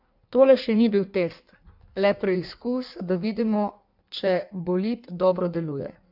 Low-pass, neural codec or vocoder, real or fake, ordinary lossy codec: 5.4 kHz; codec, 16 kHz in and 24 kHz out, 1.1 kbps, FireRedTTS-2 codec; fake; none